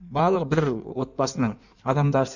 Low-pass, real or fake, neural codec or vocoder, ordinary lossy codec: 7.2 kHz; fake; codec, 16 kHz in and 24 kHz out, 1.1 kbps, FireRedTTS-2 codec; none